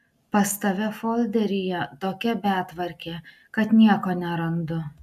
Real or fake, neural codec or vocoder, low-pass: real; none; 14.4 kHz